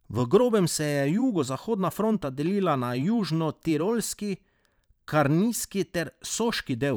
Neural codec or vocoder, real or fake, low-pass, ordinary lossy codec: vocoder, 44.1 kHz, 128 mel bands every 512 samples, BigVGAN v2; fake; none; none